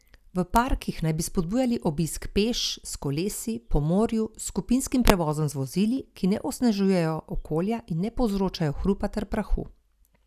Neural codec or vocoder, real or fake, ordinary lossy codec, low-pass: none; real; none; 14.4 kHz